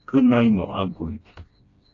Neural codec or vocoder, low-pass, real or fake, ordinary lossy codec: codec, 16 kHz, 1 kbps, FreqCodec, smaller model; 7.2 kHz; fake; AAC, 32 kbps